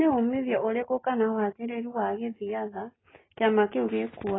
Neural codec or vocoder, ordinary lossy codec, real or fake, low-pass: none; AAC, 16 kbps; real; 7.2 kHz